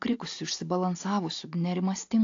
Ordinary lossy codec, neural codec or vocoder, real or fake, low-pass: AAC, 48 kbps; none; real; 7.2 kHz